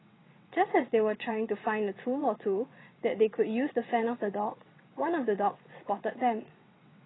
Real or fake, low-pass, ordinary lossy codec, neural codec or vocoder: fake; 7.2 kHz; AAC, 16 kbps; vocoder, 22.05 kHz, 80 mel bands, WaveNeXt